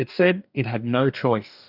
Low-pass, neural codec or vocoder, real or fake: 5.4 kHz; codec, 44.1 kHz, 2.6 kbps, DAC; fake